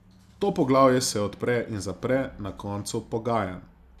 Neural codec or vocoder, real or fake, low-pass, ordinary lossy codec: none; real; 14.4 kHz; none